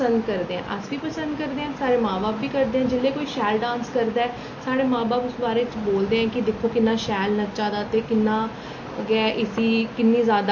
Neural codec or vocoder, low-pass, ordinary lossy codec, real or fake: none; 7.2 kHz; MP3, 32 kbps; real